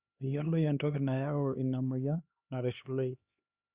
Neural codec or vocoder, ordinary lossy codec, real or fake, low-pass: codec, 16 kHz, 2 kbps, X-Codec, HuBERT features, trained on LibriSpeech; Opus, 64 kbps; fake; 3.6 kHz